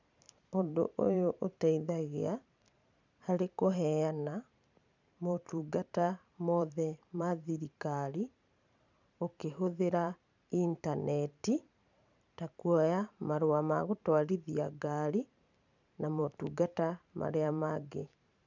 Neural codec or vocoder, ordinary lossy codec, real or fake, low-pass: vocoder, 44.1 kHz, 128 mel bands every 512 samples, BigVGAN v2; none; fake; 7.2 kHz